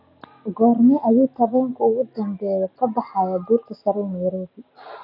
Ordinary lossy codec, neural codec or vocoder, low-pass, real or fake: none; none; 5.4 kHz; real